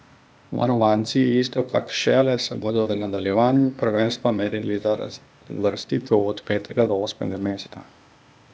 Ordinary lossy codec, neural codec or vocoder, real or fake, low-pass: none; codec, 16 kHz, 0.8 kbps, ZipCodec; fake; none